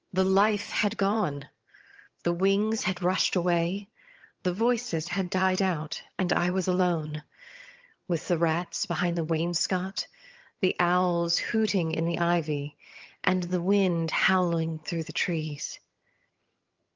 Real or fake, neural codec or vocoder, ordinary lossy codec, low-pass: fake; vocoder, 22.05 kHz, 80 mel bands, HiFi-GAN; Opus, 24 kbps; 7.2 kHz